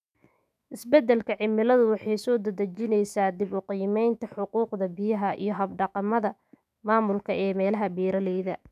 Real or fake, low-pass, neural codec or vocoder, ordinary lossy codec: fake; 14.4 kHz; autoencoder, 48 kHz, 128 numbers a frame, DAC-VAE, trained on Japanese speech; none